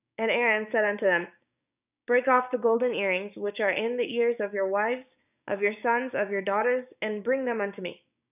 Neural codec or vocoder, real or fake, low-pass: codec, 16 kHz, 6 kbps, DAC; fake; 3.6 kHz